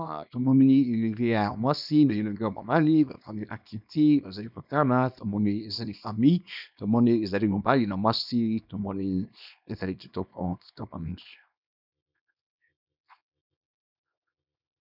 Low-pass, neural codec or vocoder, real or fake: 5.4 kHz; codec, 24 kHz, 0.9 kbps, WavTokenizer, small release; fake